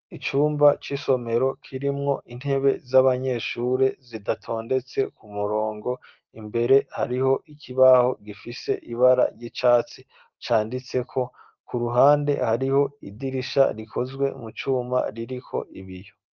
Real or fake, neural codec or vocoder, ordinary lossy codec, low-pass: real; none; Opus, 24 kbps; 7.2 kHz